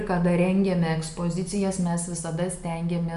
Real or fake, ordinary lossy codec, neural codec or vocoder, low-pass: real; MP3, 96 kbps; none; 10.8 kHz